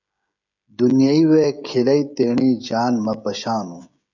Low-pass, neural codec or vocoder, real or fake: 7.2 kHz; codec, 16 kHz, 16 kbps, FreqCodec, smaller model; fake